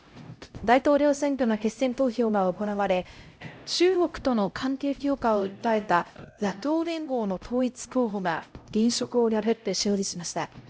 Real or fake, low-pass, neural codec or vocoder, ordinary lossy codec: fake; none; codec, 16 kHz, 0.5 kbps, X-Codec, HuBERT features, trained on LibriSpeech; none